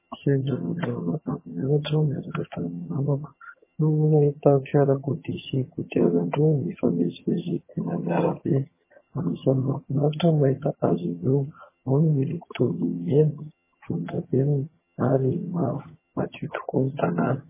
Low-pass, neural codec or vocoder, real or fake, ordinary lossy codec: 3.6 kHz; vocoder, 22.05 kHz, 80 mel bands, HiFi-GAN; fake; MP3, 16 kbps